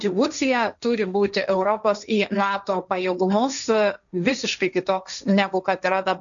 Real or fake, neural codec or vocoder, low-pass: fake; codec, 16 kHz, 1.1 kbps, Voila-Tokenizer; 7.2 kHz